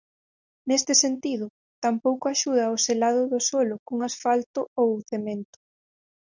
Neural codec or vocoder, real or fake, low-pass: none; real; 7.2 kHz